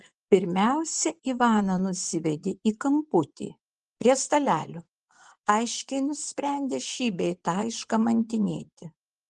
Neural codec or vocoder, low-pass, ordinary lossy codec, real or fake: vocoder, 24 kHz, 100 mel bands, Vocos; 10.8 kHz; Opus, 24 kbps; fake